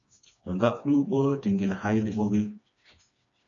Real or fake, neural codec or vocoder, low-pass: fake; codec, 16 kHz, 2 kbps, FreqCodec, smaller model; 7.2 kHz